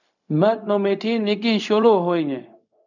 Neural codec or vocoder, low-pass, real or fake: codec, 16 kHz, 0.4 kbps, LongCat-Audio-Codec; 7.2 kHz; fake